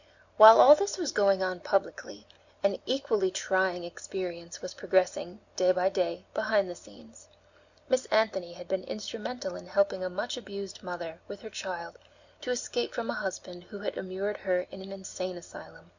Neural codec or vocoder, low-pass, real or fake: none; 7.2 kHz; real